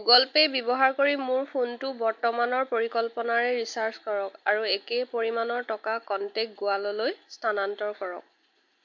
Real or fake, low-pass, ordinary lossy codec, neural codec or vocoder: real; 7.2 kHz; MP3, 48 kbps; none